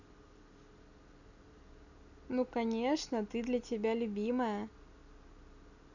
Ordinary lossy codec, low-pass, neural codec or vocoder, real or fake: none; 7.2 kHz; none; real